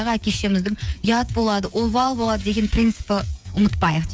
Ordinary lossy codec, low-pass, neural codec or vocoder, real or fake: none; none; codec, 16 kHz, 8 kbps, FreqCodec, smaller model; fake